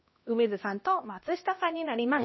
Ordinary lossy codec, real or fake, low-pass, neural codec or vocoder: MP3, 24 kbps; fake; 7.2 kHz; codec, 16 kHz, 1 kbps, X-Codec, HuBERT features, trained on LibriSpeech